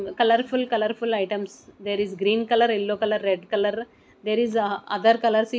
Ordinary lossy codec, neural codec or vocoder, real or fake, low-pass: none; none; real; none